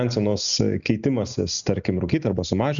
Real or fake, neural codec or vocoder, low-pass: real; none; 7.2 kHz